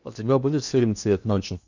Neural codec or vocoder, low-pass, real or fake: codec, 16 kHz in and 24 kHz out, 0.8 kbps, FocalCodec, streaming, 65536 codes; 7.2 kHz; fake